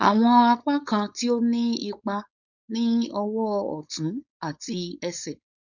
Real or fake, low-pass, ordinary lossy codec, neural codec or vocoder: fake; 7.2 kHz; none; codec, 16 kHz, 4.8 kbps, FACodec